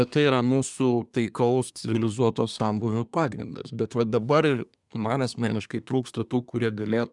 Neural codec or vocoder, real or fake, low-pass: codec, 24 kHz, 1 kbps, SNAC; fake; 10.8 kHz